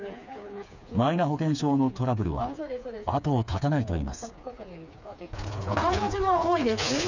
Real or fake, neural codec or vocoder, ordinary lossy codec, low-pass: fake; codec, 16 kHz, 4 kbps, FreqCodec, smaller model; none; 7.2 kHz